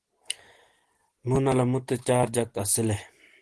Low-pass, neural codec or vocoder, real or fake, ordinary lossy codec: 10.8 kHz; none; real; Opus, 16 kbps